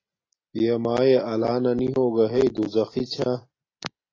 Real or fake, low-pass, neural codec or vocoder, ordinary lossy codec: real; 7.2 kHz; none; AAC, 32 kbps